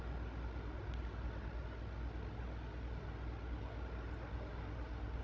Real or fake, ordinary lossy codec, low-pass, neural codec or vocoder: fake; none; none; codec, 16 kHz, 16 kbps, FreqCodec, larger model